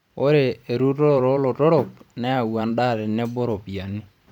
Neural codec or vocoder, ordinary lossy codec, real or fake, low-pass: vocoder, 44.1 kHz, 128 mel bands every 512 samples, BigVGAN v2; none; fake; 19.8 kHz